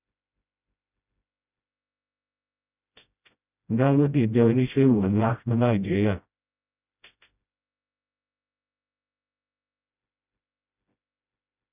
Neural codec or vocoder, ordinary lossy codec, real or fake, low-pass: codec, 16 kHz, 0.5 kbps, FreqCodec, smaller model; none; fake; 3.6 kHz